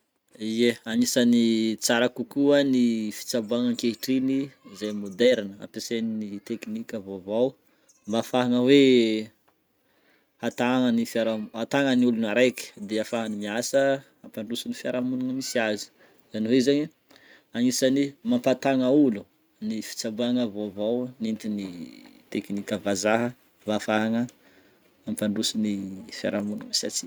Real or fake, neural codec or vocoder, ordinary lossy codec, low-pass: real; none; none; none